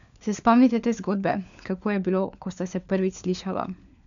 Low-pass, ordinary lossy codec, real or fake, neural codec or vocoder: 7.2 kHz; MP3, 96 kbps; fake; codec, 16 kHz, 4 kbps, FunCodec, trained on LibriTTS, 50 frames a second